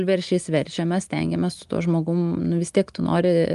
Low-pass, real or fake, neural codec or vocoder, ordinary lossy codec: 10.8 kHz; real; none; Opus, 32 kbps